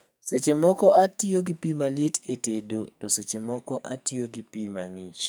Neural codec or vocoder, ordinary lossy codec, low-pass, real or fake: codec, 44.1 kHz, 2.6 kbps, SNAC; none; none; fake